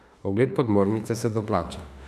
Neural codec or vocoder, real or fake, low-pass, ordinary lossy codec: autoencoder, 48 kHz, 32 numbers a frame, DAC-VAE, trained on Japanese speech; fake; 14.4 kHz; none